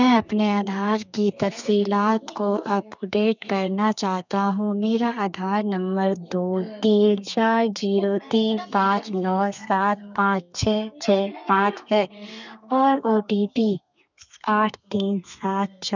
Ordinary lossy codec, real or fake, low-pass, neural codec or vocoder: none; fake; 7.2 kHz; codec, 32 kHz, 1.9 kbps, SNAC